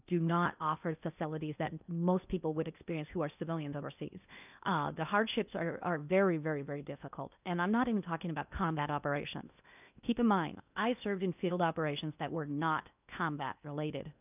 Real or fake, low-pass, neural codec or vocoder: fake; 3.6 kHz; codec, 16 kHz in and 24 kHz out, 0.8 kbps, FocalCodec, streaming, 65536 codes